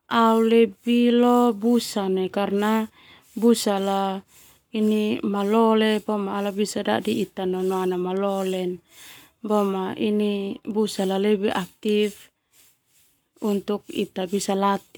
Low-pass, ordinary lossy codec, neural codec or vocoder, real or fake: none; none; codec, 44.1 kHz, 7.8 kbps, Pupu-Codec; fake